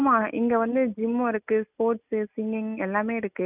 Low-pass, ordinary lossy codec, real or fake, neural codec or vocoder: 3.6 kHz; none; real; none